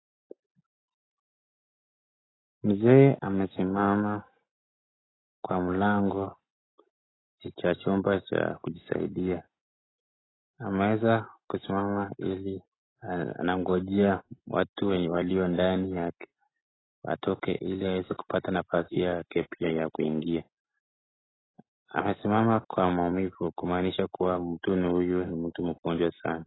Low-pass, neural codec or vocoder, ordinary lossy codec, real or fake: 7.2 kHz; none; AAC, 16 kbps; real